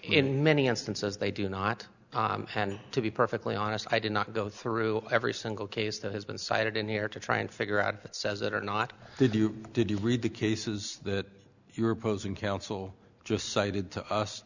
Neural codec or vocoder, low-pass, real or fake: none; 7.2 kHz; real